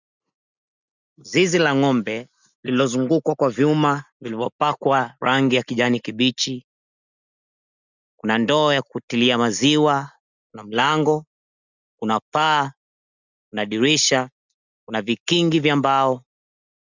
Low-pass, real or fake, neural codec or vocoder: 7.2 kHz; real; none